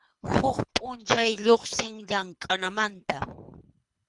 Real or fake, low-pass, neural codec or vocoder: fake; 10.8 kHz; codec, 24 kHz, 3 kbps, HILCodec